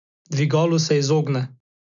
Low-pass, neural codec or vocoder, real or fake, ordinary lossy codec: 7.2 kHz; none; real; none